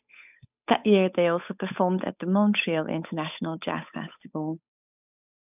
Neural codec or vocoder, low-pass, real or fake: codec, 16 kHz, 8 kbps, FunCodec, trained on Chinese and English, 25 frames a second; 3.6 kHz; fake